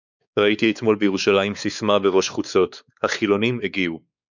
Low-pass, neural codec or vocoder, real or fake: 7.2 kHz; codec, 16 kHz, 4 kbps, X-Codec, WavLM features, trained on Multilingual LibriSpeech; fake